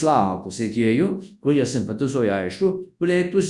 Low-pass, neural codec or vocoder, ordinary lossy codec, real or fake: 10.8 kHz; codec, 24 kHz, 0.9 kbps, WavTokenizer, large speech release; Opus, 64 kbps; fake